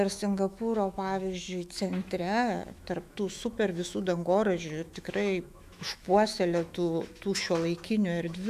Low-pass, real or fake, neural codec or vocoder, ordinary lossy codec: 14.4 kHz; fake; autoencoder, 48 kHz, 128 numbers a frame, DAC-VAE, trained on Japanese speech; AAC, 96 kbps